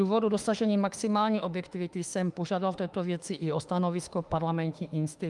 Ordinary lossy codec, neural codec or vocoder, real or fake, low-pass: Opus, 32 kbps; autoencoder, 48 kHz, 32 numbers a frame, DAC-VAE, trained on Japanese speech; fake; 10.8 kHz